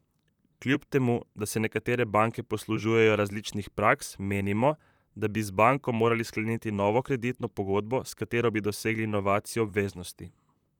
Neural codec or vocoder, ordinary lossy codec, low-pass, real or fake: vocoder, 44.1 kHz, 128 mel bands, Pupu-Vocoder; none; 19.8 kHz; fake